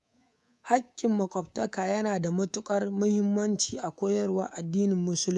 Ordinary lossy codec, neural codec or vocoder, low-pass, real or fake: none; codec, 24 kHz, 3.1 kbps, DualCodec; none; fake